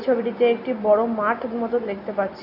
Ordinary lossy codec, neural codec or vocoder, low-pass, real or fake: none; none; 5.4 kHz; real